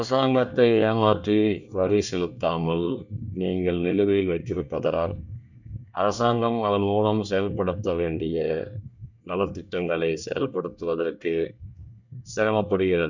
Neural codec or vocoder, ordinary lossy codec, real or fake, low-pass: codec, 24 kHz, 1 kbps, SNAC; none; fake; 7.2 kHz